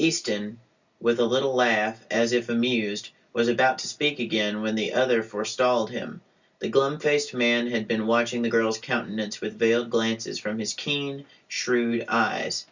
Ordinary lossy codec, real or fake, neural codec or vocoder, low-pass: Opus, 64 kbps; real; none; 7.2 kHz